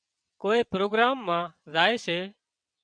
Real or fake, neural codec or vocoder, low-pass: fake; vocoder, 22.05 kHz, 80 mel bands, WaveNeXt; 9.9 kHz